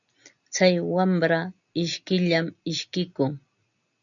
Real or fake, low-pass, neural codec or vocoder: real; 7.2 kHz; none